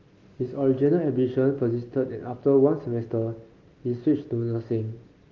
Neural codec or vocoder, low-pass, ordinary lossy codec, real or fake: none; 7.2 kHz; Opus, 32 kbps; real